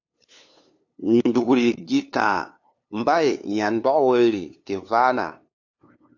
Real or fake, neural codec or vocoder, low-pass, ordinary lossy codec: fake; codec, 16 kHz, 2 kbps, FunCodec, trained on LibriTTS, 25 frames a second; 7.2 kHz; AAC, 48 kbps